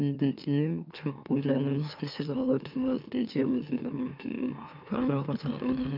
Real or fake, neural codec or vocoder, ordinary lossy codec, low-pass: fake; autoencoder, 44.1 kHz, a latent of 192 numbers a frame, MeloTTS; none; 5.4 kHz